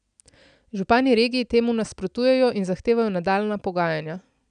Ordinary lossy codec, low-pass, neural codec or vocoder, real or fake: none; 9.9 kHz; none; real